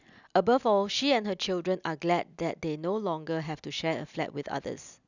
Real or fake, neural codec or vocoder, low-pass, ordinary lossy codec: real; none; 7.2 kHz; none